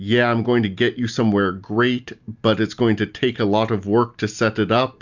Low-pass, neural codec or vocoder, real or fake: 7.2 kHz; none; real